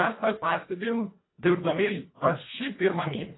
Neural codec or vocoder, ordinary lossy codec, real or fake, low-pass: codec, 24 kHz, 1.5 kbps, HILCodec; AAC, 16 kbps; fake; 7.2 kHz